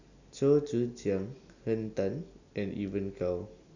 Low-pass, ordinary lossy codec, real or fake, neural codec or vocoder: 7.2 kHz; none; real; none